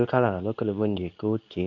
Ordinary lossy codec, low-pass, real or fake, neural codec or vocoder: none; 7.2 kHz; fake; codec, 24 kHz, 0.9 kbps, WavTokenizer, medium speech release version 2